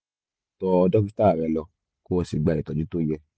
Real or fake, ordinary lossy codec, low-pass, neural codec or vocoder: real; none; none; none